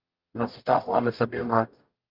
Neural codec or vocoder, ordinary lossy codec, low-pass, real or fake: codec, 44.1 kHz, 0.9 kbps, DAC; Opus, 24 kbps; 5.4 kHz; fake